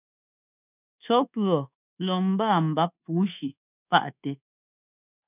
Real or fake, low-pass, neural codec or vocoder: fake; 3.6 kHz; codec, 24 kHz, 1.2 kbps, DualCodec